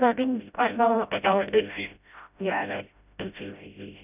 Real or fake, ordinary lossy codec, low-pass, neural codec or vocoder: fake; none; 3.6 kHz; codec, 16 kHz, 0.5 kbps, FreqCodec, smaller model